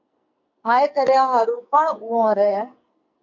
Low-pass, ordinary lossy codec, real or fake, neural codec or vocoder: 7.2 kHz; MP3, 64 kbps; fake; codec, 44.1 kHz, 2.6 kbps, SNAC